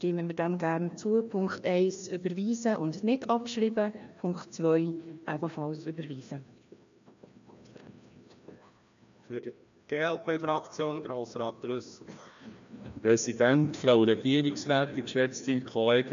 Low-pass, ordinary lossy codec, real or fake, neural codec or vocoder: 7.2 kHz; MP3, 64 kbps; fake; codec, 16 kHz, 1 kbps, FreqCodec, larger model